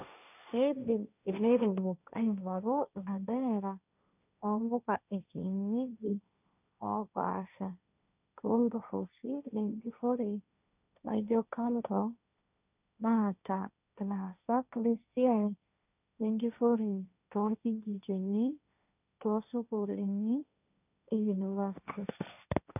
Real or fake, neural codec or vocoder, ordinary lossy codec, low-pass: fake; codec, 16 kHz, 1.1 kbps, Voila-Tokenizer; none; 3.6 kHz